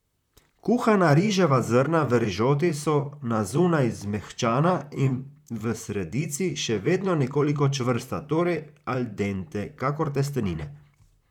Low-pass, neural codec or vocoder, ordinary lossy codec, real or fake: 19.8 kHz; vocoder, 44.1 kHz, 128 mel bands, Pupu-Vocoder; none; fake